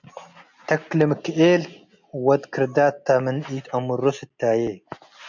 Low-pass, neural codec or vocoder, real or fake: 7.2 kHz; none; real